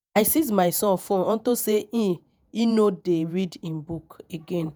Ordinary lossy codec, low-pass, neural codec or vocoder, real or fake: none; none; vocoder, 48 kHz, 128 mel bands, Vocos; fake